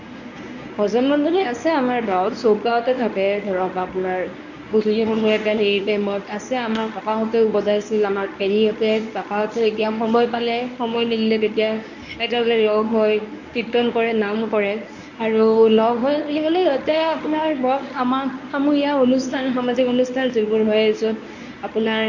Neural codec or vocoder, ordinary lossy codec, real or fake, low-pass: codec, 24 kHz, 0.9 kbps, WavTokenizer, medium speech release version 1; none; fake; 7.2 kHz